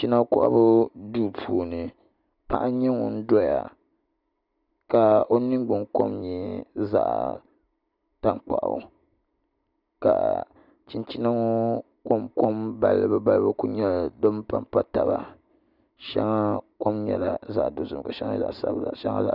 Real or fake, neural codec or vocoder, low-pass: real; none; 5.4 kHz